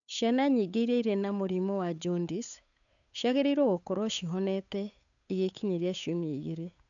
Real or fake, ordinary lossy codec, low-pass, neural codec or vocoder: fake; none; 7.2 kHz; codec, 16 kHz, 8 kbps, FunCodec, trained on Chinese and English, 25 frames a second